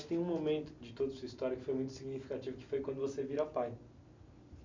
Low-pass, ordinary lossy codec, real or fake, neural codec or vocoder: 7.2 kHz; MP3, 64 kbps; real; none